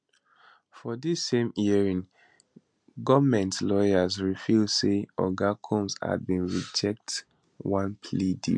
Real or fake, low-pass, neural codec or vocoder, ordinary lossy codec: real; 9.9 kHz; none; MP3, 64 kbps